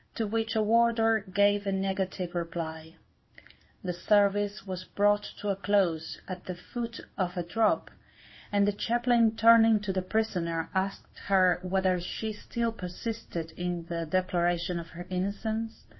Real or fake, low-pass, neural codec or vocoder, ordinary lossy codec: fake; 7.2 kHz; codec, 16 kHz in and 24 kHz out, 1 kbps, XY-Tokenizer; MP3, 24 kbps